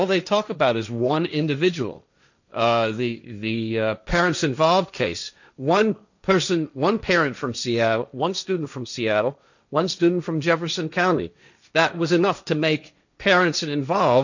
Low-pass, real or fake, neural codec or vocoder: 7.2 kHz; fake; codec, 16 kHz, 1.1 kbps, Voila-Tokenizer